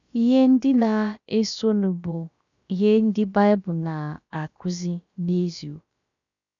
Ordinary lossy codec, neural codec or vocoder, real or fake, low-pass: none; codec, 16 kHz, about 1 kbps, DyCAST, with the encoder's durations; fake; 7.2 kHz